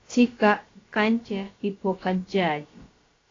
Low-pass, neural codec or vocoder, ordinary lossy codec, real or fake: 7.2 kHz; codec, 16 kHz, about 1 kbps, DyCAST, with the encoder's durations; AAC, 32 kbps; fake